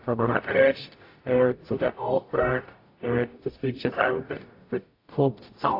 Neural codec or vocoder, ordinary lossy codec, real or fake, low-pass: codec, 44.1 kHz, 0.9 kbps, DAC; AAC, 32 kbps; fake; 5.4 kHz